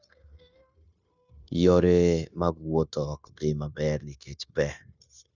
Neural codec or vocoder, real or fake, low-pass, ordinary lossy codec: codec, 16 kHz, 0.9 kbps, LongCat-Audio-Codec; fake; 7.2 kHz; none